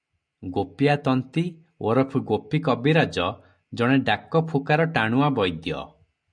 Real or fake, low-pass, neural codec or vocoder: real; 9.9 kHz; none